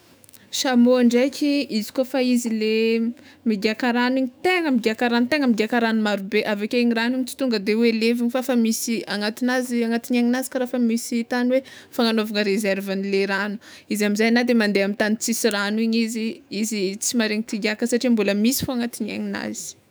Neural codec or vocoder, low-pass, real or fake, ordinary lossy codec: autoencoder, 48 kHz, 128 numbers a frame, DAC-VAE, trained on Japanese speech; none; fake; none